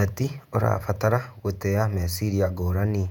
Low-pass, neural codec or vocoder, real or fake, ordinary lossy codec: 19.8 kHz; vocoder, 48 kHz, 128 mel bands, Vocos; fake; none